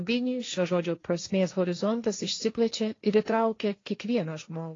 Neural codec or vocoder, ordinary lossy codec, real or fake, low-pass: codec, 16 kHz, 1.1 kbps, Voila-Tokenizer; AAC, 32 kbps; fake; 7.2 kHz